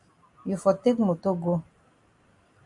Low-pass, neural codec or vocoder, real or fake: 10.8 kHz; none; real